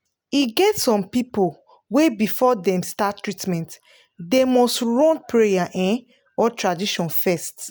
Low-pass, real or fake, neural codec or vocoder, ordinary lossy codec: none; real; none; none